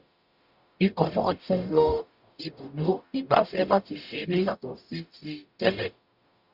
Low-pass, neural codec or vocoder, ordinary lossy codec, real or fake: 5.4 kHz; codec, 44.1 kHz, 0.9 kbps, DAC; Opus, 64 kbps; fake